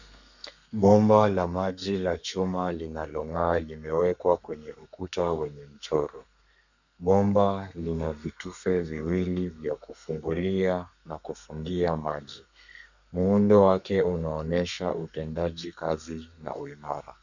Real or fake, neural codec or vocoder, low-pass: fake; codec, 32 kHz, 1.9 kbps, SNAC; 7.2 kHz